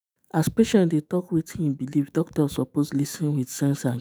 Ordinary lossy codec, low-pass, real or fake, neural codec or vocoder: none; none; fake; autoencoder, 48 kHz, 128 numbers a frame, DAC-VAE, trained on Japanese speech